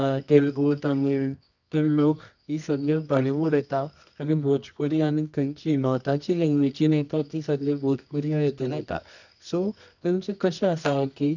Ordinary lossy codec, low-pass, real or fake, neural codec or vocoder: none; 7.2 kHz; fake; codec, 24 kHz, 0.9 kbps, WavTokenizer, medium music audio release